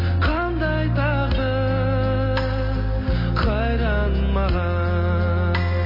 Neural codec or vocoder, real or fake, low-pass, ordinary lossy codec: none; real; 5.4 kHz; MP3, 24 kbps